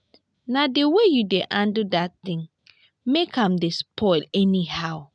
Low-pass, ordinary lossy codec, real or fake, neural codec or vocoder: 9.9 kHz; none; real; none